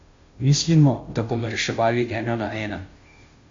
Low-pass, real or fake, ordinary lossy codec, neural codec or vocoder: 7.2 kHz; fake; AAC, 48 kbps; codec, 16 kHz, 0.5 kbps, FunCodec, trained on Chinese and English, 25 frames a second